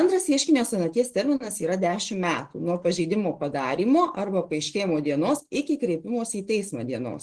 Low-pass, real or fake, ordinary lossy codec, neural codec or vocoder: 10.8 kHz; real; Opus, 16 kbps; none